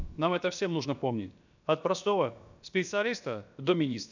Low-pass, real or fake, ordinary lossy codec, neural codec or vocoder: 7.2 kHz; fake; none; codec, 16 kHz, about 1 kbps, DyCAST, with the encoder's durations